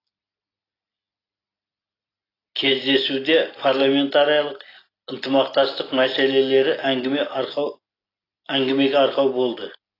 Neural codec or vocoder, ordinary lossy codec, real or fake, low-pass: none; AAC, 24 kbps; real; 5.4 kHz